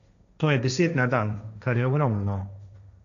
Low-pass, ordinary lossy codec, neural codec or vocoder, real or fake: 7.2 kHz; MP3, 96 kbps; codec, 16 kHz, 1.1 kbps, Voila-Tokenizer; fake